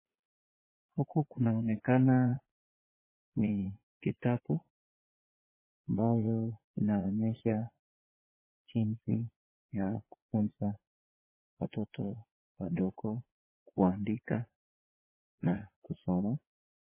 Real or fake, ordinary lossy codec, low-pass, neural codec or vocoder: fake; MP3, 16 kbps; 3.6 kHz; vocoder, 22.05 kHz, 80 mel bands, Vocos